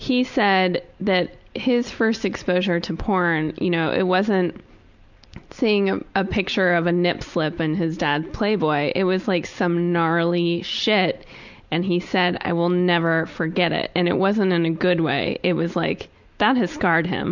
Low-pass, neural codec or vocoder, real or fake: 7.2 kHz; none; real